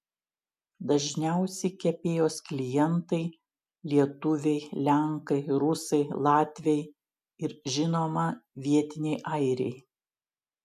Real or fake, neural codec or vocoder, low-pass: real; none; 14.4 kHz